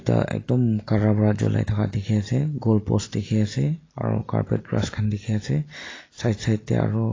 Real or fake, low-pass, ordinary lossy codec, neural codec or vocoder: real; 7.2 kHz; AAC, 32 kbps; none